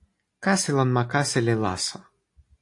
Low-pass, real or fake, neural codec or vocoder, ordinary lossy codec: 10.8 kHz; real; none; AAC, 48 kbps